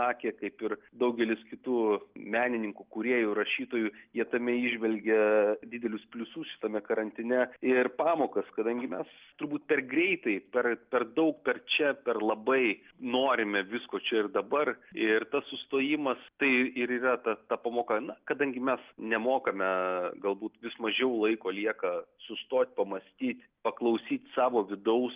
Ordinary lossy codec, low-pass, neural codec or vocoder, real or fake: Opus, 32 kbps; 3.6 kHz; none; real